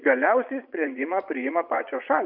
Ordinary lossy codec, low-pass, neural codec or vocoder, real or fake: Opus, 64 kbps; 5.4 kHz; vocoder, 22.05 kHz, 80 mel bands, Vocos; fake